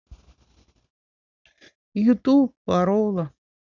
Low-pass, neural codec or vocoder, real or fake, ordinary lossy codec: 7.2 kHz; none; real; none